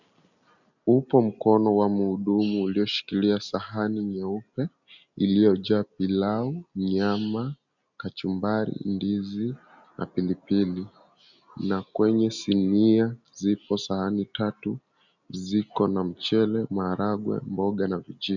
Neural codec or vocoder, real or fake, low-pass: none; real; 7.2 kHz